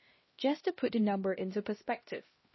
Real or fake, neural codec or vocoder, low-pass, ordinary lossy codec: fake; codec, 16 kHz, 1 kbps, X-Codec, HuBERT features, trained on LibriSpeech; 7.2 kHz; MP3, 24 kbps